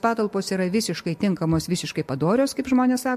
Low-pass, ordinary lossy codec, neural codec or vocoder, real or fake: 14.4 kHz; MP3, 64 kbps; none; real